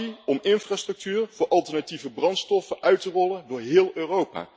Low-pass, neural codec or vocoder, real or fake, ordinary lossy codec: none; none; real; none